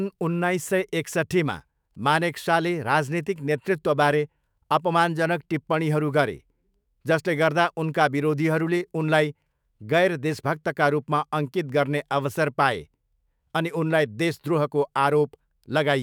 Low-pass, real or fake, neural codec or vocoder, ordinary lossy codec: none; fake; autoencoder, 48 kHz, 128 numbers a frame, DAC-VAE, trained on Japanese speech; none